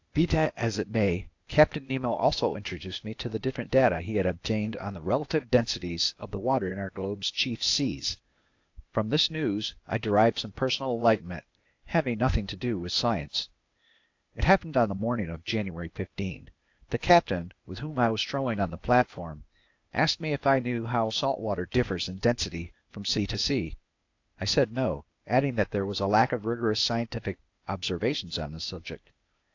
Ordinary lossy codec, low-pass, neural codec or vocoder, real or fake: AAC, 48 kbps; 7.2 kHz; codec, 16 kHz, 0.8 kbps, ZipCodec; fake